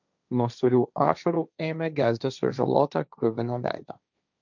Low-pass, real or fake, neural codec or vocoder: 7.2 kHz; fake; codec, 16 kHz, 1.1 kbps, Voila-Tokenizer